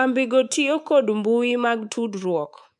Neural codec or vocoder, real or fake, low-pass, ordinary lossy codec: codec, 24 kHz, 3.1 kbps, DualCodec; fake; none; none